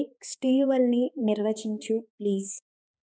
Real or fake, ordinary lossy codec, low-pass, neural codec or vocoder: fake; none; none; codec, 16 kHz, 2 kbps, X-Codec, HuBERT features, trained on balanced general audio